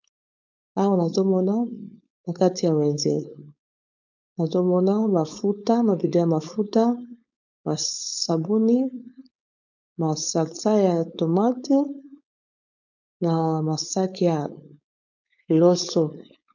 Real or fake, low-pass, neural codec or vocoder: fake; 7.2 kHz; codec, 16 kHz, 4.8 kbps, FACodec